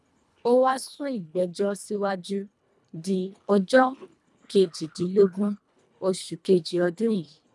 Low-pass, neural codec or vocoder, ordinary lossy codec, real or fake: none; codec, 24 kHz, 1.5 kbps, HILCodec; none; fake